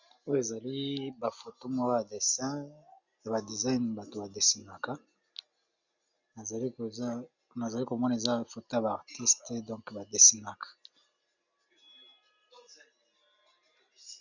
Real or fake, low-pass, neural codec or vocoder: real; 7.2 kHz; none